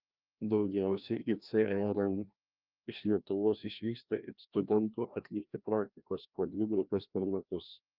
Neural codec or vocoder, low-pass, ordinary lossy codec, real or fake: codec, 16 kHz, 1 kbps, FreqCodec, larger model; 5.4 kHz; Opus, 24 kbps; fake